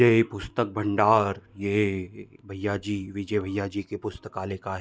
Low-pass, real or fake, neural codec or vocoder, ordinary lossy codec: none; real; none; none